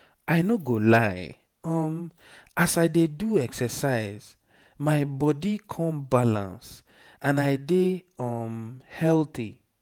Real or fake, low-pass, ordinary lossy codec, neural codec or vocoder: fake; 19.8 kHz; none; vocoder, 48 kHz, 128 mel bands, Vocos